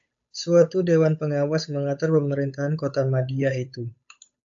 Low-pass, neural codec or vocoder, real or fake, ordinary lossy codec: 7.2 kHz; codec, 16 kHz, 8 kbps, FunCodec, trained on Chinese and English, 25 frames a second; fake; AAC, 64 kbps